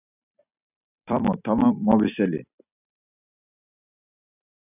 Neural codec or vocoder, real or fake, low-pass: vocoder, 44.1 kHz, 128 mel bands every 256 samples, BigVGAN v2; fake; 3.6 kHz